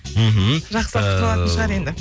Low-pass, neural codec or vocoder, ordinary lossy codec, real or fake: none; none; none; real